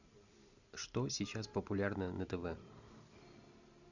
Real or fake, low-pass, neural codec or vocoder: real; 7.2 kHz; none